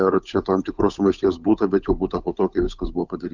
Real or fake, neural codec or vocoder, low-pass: fake; codec, 16 kHz, 8 kbps, FunCodec, trained on Chinese and English, 25 frames a second; 7.2 kHz